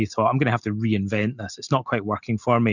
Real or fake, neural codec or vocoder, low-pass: real; none; 7.2 kHz